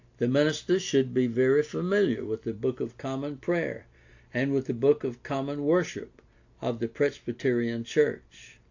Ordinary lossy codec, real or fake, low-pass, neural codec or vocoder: AAC, 48 kbps; real; 7.2 kHz; none